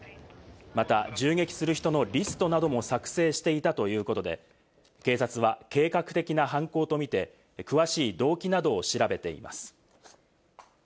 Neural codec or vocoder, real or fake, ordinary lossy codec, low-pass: none; real; none; none